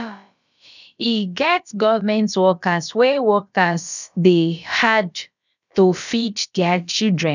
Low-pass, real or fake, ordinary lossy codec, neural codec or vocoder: 7.2 kHz; fake; none; codec, 16 kHz, about 1 kbps, DyCAST, with the encoder's durations